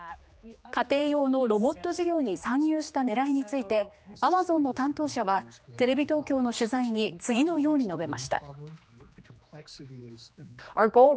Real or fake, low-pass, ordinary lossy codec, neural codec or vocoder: fake; none; none; codec, 16 kHz, 2 kbps, X-Codec, HuBERT features, trained on general audio